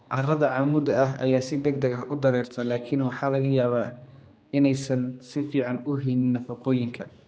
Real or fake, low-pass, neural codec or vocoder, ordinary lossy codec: fake; none; codec, 16 kHz, 2 kbps, X-Codec, HuBERT features, trained on general audio; none